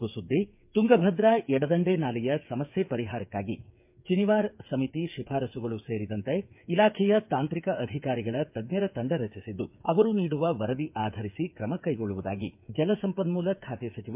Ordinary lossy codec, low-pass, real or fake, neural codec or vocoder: MP3, 32 kbps; 3.6 kHz; fake; codec, 16 kHz, 8 kbps, FreqCodec, smaller model